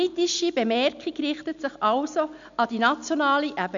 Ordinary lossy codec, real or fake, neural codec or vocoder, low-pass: MP3, 64 kbps; real; none; 7.2 kHz